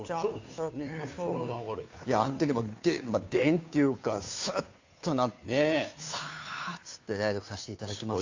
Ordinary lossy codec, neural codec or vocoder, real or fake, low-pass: AAC, 48 kbps; codec, 16 kHz, 2 kbps, FunCodec, trained on Chinese and English, 25 frames a second; fake; 7.2 kHz